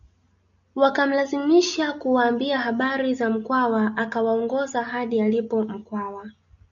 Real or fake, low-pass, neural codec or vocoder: real; 7.2 kHz; none